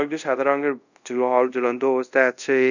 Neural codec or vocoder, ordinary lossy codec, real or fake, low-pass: codec, 24 kHz, 0.5 kbps, DualCodec; none; fake; 7.2 kHz